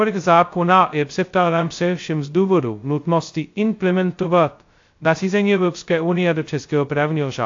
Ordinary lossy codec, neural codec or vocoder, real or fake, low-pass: AAC, 48 kbps; codec, 16 kHz, 0.2 kbps, FocalCodec; fake; 7.2 kHz